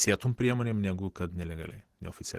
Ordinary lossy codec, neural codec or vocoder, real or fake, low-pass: Opus, 16 kbps; vocoder, 44.1 kHz, 128 mel bands every 512 samples, BigVGAN v2; fake; 14.4 kHz